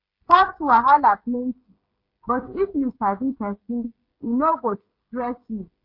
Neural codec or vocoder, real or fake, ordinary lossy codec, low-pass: codec, 16 kHz, 16 kbps, FreqCodec, smaller model; fake; MP3, 32 kbps; 5.4 kHz